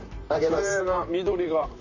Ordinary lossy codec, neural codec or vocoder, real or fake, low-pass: none; vocoder, 44.1 kHz, 128 mel bands, Pupu-Vocoder; fake; 7.2 kHz